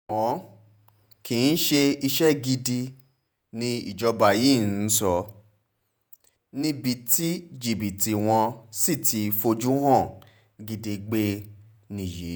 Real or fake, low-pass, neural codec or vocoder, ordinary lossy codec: fake; none; vocoder, 48 kHz, 128 mel bands, Vocos; none